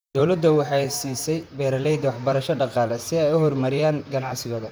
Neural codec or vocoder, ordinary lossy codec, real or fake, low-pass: vocoder, 44.1 kHz, 128 mel bands, Pupu-Vocoder; none; fake; none